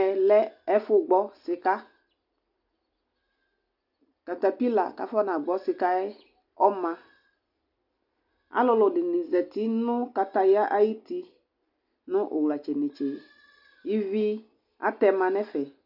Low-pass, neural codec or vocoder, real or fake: 5.4 kHz; none; real